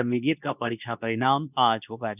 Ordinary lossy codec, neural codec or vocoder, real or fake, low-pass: none; codec, 24 kHz, 0.9 kbps, WavTokenizer, medium speech release version 2; fake; 3.6 kHz